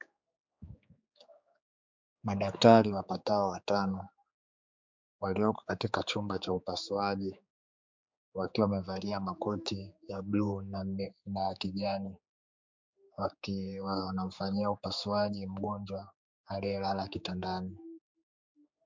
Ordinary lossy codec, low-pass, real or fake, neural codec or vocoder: MP3, 64 kbps; 7.2 kHz; fake; codec, 16 kHz, 4 kbps, X-Codec, HuBERT features, trained on general audio